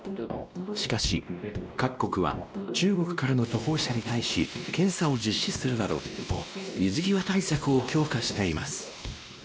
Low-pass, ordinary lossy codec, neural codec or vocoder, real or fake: none; none; codec, 16 kHz, 1 kbps, X-Codec, WavLM features, trained on Multilingual LibriSpeech; fake